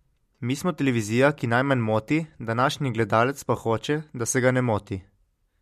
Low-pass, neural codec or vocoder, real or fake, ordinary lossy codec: 14.4 kHz; none; real; MP3, 64 kbps